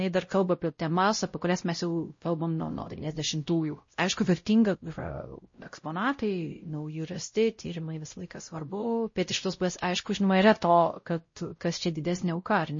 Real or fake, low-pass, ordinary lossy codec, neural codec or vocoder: fake; 7.2 kHz; MP3, 32 kbps; codec, 16 kHz, 0.5 kbps, X-Codec, WavLM features, trained on Multilingual LibriSpeech